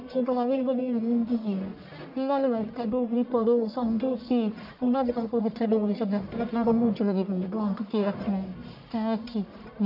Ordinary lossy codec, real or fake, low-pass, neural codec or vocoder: AAC, 48 kbps; fake; 5.4 kHz; codec, 44.1 kHz, 1.7 kbps, Pupu-Codec